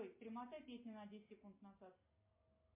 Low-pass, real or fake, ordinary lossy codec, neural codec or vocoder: 3.6 kHz; real; MP3, 24 kbps; none